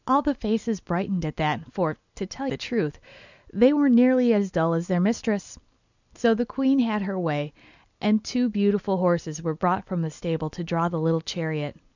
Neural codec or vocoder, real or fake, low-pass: none; real; 7.2 kHz